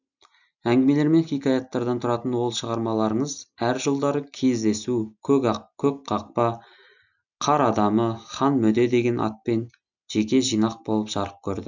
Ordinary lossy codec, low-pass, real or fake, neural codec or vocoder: none; 7.2 kHz; real; none